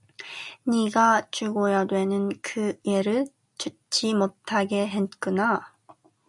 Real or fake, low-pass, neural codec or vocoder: real; 10.8 kHz; none